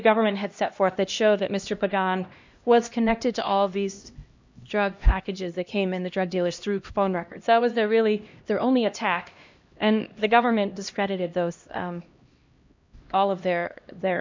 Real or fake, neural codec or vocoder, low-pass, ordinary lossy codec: fake; codec, 16 kHz, 1 kbps, X-Codec, HuBERT features, trained on LibriSpeech; 7.2 kHz; MP3, 64 kbps